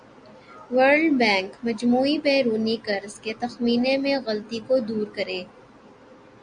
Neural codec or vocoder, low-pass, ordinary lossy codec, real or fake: none; 9.9 kHz; Opus, 64 kbps; real